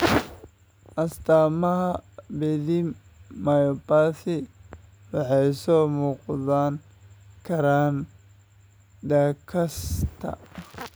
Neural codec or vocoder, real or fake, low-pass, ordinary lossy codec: none; real; none; none